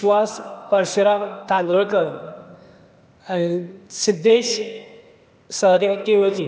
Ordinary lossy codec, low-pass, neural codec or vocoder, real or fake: none; none; codec, 16 kHz, 0.8 kbps, ZipCodec; fake